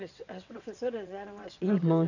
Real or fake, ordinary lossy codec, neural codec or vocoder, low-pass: fake; none; vocoder, 22.05 kHz, 80 mel bands, WaveNeXt; 7.2 kHz